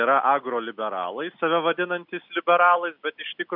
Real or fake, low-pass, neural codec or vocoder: real; 5.4 kHz; none